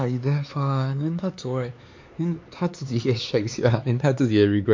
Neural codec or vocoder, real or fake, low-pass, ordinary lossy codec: codec, 16 kHz, 4 kbps, X-Codec, HuBERT features, trained on LibriSpeech; fake; 7.2 kHz; MP3, 48 kbps